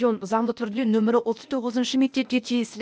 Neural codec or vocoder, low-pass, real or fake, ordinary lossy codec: codec, 16 kHz, 0.8 kbps, ZipCodec; none; fake; none